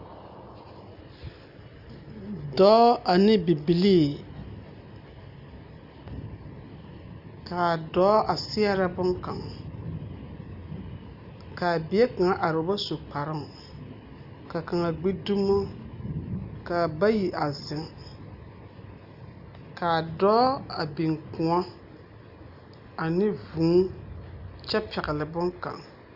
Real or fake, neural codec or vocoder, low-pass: real; none; 5.4 kHz